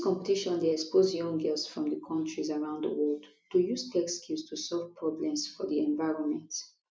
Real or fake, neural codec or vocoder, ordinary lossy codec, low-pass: real; none; none; none